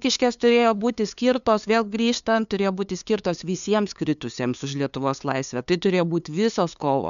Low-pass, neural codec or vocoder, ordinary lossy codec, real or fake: 7.2 kHz; codec, 16 kHz, 2 kbps, FunCodec, trained on LibriTTS, 25 frames a second; MP3, 96 kbps; fake